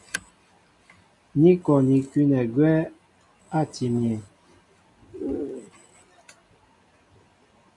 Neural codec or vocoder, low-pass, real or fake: none; 10.8 kHz; real